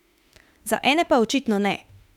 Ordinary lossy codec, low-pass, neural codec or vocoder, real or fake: none; 19.8 kHz; autoencoder, 48 kHz, 32 numbers a frame, DAC-VAE, trained on Japanese speech; fake